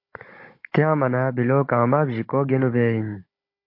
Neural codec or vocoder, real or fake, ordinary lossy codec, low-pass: codec, 16 kHz, 16 kbps, FunCodec, trained on Chinese and English, 50 frames a second; fake; MP3, 32 kbps; 5.4 kHz